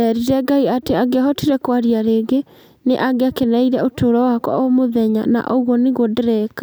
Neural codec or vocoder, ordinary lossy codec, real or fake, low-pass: none; none; real; none